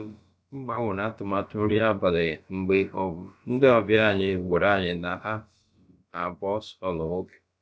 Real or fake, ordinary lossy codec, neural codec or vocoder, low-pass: fake; none; codec, 16 kHz, about 1 kbps, DyCAST, with the encoder's durations; none